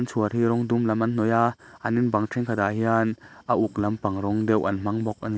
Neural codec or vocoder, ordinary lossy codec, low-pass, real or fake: none; none; none; real